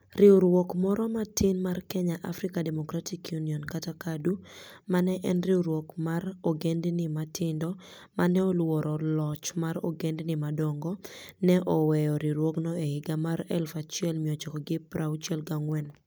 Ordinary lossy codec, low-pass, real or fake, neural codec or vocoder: none; none; real; none